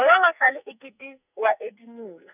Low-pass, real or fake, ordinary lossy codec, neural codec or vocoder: 3.6 kHz; fake; none; codec, 44.1 kHz, 2.6 kbps, SNAC